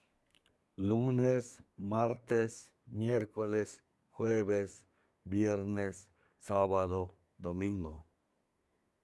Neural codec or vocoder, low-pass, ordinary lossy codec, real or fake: codec, 24 kHz, 1 kbps, SNAC; none; none; fake